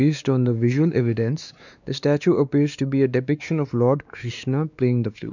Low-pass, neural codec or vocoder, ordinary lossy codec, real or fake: 7.2 kHz; codec, 16 kHz, 2 kbps, X-Codec, WavLM features, trained on Multilingual LibriSpeech; none; fake